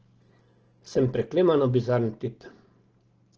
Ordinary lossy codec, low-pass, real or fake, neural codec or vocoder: Opus, 16 kbps; 7.2 kHz; real; none